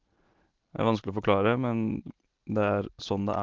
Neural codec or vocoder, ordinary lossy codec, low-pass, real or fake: none; Opus, 16 kbps; 7.2 kHz; real